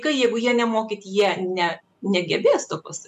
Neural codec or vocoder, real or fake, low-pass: none; real; 14.4 kHz